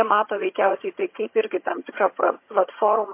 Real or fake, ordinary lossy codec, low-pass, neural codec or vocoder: fake; MP3, 24 kbps; 3.6 kHz; vocoder, 22.05 kHz, 80 mel bands, HiFi-GAN